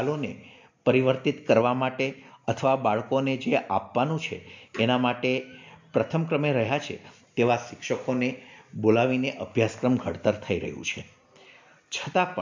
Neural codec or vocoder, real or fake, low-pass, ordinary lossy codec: none; real; 7.2 kHz; MP3, 64 kbps